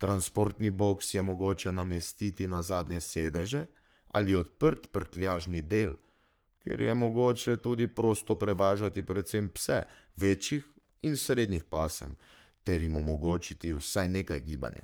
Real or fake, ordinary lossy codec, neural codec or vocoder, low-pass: fake; none; codec, 44.1 kHz, 3.4 kbps, Pupu-Codec; none